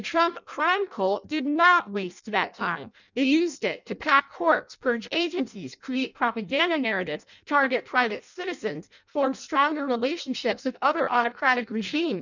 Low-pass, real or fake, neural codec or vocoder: 7.2 kHz; fake; codec, 16 kHz in and 24 kHz out, 0.6 kbps, FireRedTTS-2 codec